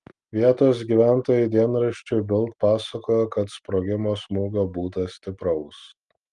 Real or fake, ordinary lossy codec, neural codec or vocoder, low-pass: real; Opus, 32 kbps; none; 10.8 kHz